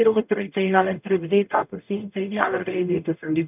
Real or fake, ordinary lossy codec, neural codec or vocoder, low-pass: fake; none; codec, 44.1 kHz, 0.9 kbps, DAC; 3.6 kHz